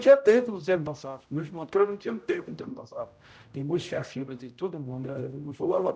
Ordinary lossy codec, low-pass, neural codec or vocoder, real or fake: none; none; codec, 16 kHz, 0.5 kbps, X-Codec, HuBERT features, trained on general audio; fake